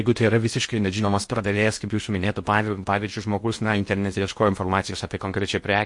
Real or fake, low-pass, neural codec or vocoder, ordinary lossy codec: fake; 10.8 kHz; codec, 16 kHz in and 24 kHz out, 0.6 kbps, FocalCodec, streaming, 2048 codes; MP3, 48 kbps